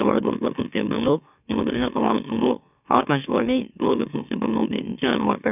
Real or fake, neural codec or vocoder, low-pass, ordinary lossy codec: fake; autoencoder, 44.1 kHz, a latent of 192 numbers a frame, MeloTTS; 3.6 kHz; none